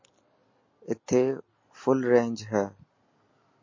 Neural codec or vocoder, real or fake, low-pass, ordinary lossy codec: none; real; 7.2 kHz; MP3, 32 kbps